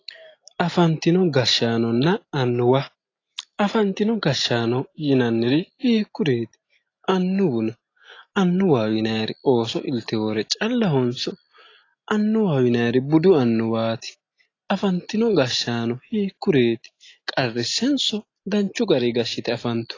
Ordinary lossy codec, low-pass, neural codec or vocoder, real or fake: AAC, 32 kbps; 7.2 kHz; none; real